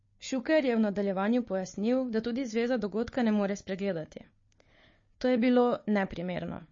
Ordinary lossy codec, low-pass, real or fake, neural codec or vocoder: MP3, 32 kbps; 7.2 kHz; fake; codec, 16 kHz, 4 kbps, FunCodec, trained on Chinese and English, 50 frames a second